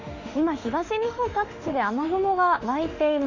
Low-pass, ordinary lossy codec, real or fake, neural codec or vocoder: 7.2 kHz; none; fake; autoencoder, 48 kHz, 32 numbers a frame, DAC-VAE, trained on Japanese speech